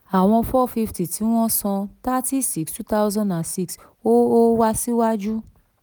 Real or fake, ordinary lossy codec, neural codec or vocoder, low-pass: real; none; none; none